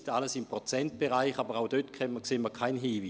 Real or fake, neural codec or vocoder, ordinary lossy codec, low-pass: real; none; none; none